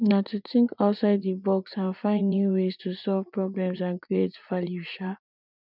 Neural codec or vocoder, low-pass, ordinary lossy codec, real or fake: vocoder, 44.1 kHz, 80 mel bands, Vocos; 5.4 kHz; none; fake